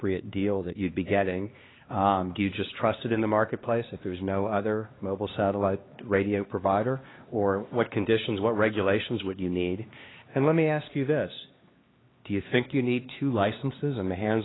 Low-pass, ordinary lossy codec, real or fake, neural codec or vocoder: 7.2 kHz; AAC, 16 kbps; fake; codec, 16 kHz, 2 kbps, X-Codec, HuBERT features, trained on LibriSpeech